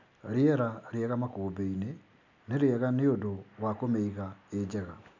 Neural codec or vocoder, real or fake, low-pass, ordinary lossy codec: none; real; 7.2 kHz; none